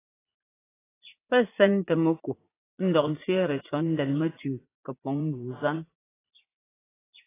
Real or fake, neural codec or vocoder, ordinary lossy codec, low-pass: fake; vocoder, 44.1 kHz, 80 mel bands, Vocos; AAC, 16 kbps; 3.6 kHz